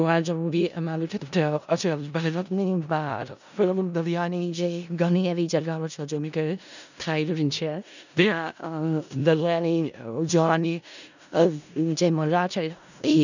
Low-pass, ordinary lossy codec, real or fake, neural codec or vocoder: 7.2 kHz; none; fake; codec, 16 kHz in and 24 kHz out, 0.4 kbps, LongCat-Audio-Codec, four codebook decoder